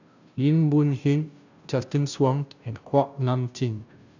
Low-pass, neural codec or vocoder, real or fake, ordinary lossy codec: 7.2 kHz; codec, 16 kHz, 0.5 kbps, FunCodec, trained on Chinese and English, 25 frames a second; fake; none